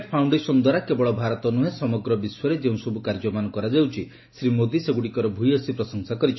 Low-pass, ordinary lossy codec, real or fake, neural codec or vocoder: 7.2 kHz; MP3, 24 kbps; real; none